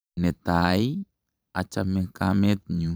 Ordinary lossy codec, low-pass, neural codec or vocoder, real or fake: none; none; vocoder, 44.1 kHz, 128 mel bands every 512 samples, BigVGAN v2; fake